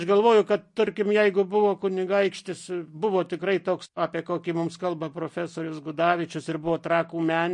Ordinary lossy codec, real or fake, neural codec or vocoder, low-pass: MP3, 48 kbps; real; none; 10.8 kHz